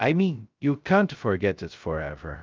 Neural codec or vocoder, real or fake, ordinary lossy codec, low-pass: codec, 16 kHz, 0.2 kbps, FocalCodec; fake; Opus, 24 kbps; 7.2 kHz